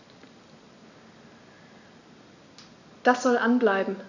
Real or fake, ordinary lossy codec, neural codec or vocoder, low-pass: real; none; none; 7.2 kHz